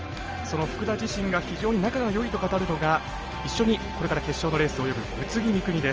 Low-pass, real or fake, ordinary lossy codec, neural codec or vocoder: 7.2 kHz; real; Opus, 24 kbps; none